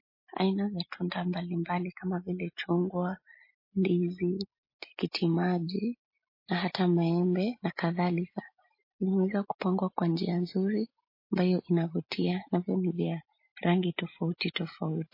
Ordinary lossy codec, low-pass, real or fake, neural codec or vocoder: MP3, 24 kbps; 5.4 kHz; real; none